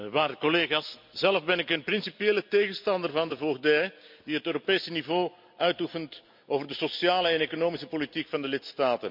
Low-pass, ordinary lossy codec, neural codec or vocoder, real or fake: 5.4 kHz; MP3, 48 kbps; none; real